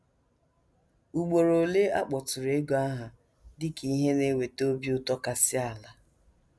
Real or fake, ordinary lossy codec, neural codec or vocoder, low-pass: real; none; none; none